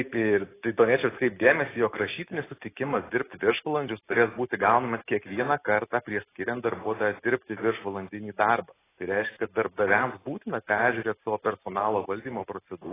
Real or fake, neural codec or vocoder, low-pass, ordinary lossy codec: real; none; 3.6 kHz; AAC, 16 kbps